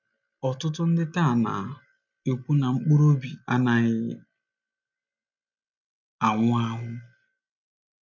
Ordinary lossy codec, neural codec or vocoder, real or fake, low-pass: none; none; real; 7.2 kHz